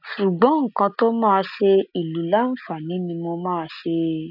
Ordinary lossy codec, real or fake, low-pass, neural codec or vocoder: none; real; 5.4 kHz; none